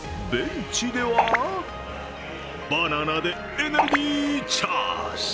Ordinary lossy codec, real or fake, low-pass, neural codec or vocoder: none; real; none; none